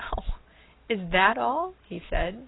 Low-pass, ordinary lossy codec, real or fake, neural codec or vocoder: 7.2 kHz; AAC, 16 kbps; real; none